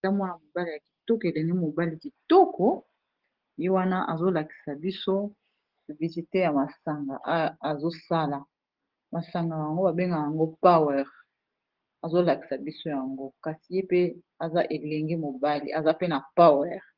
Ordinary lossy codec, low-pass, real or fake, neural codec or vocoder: Opus, 16 kbps; 5.4 kHz; real; none